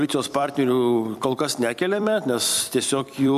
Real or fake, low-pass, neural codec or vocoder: fake; 14.4 kHz; vocoder, 44.1 kHz, 128 mel bands every 256 samples, BigVGAN v2